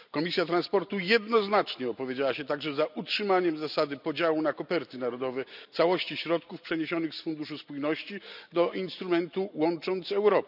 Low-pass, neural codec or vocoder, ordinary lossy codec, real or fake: 5.4 kHz; none; none; real